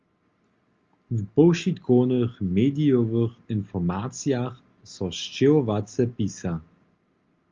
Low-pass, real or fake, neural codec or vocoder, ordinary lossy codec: 7.2 kHz; real; none; Opus, 32 kbps